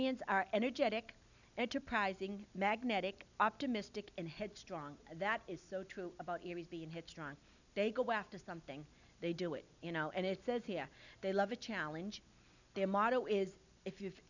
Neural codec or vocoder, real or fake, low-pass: none; real; 7.2 kHz